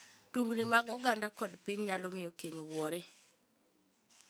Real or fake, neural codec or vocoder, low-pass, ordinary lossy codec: fake; codec, 44.1 kHz, 2.6 kbps, SNAC; none; none